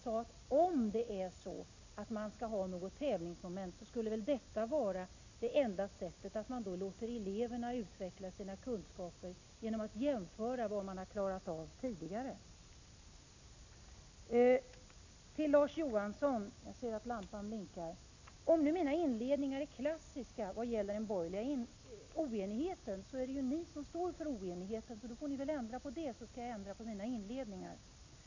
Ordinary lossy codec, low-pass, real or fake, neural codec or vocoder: AAC, 48 kbps; 7.2 kHz; real; none